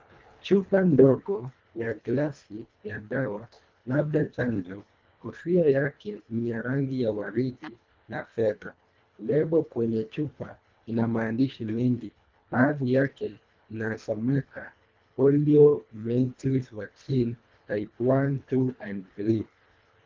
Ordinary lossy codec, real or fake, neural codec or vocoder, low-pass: Opus, 24 kbps; fake; codec, 24 kHz, 1.5 kbps, HILCodec; 7.2 kHz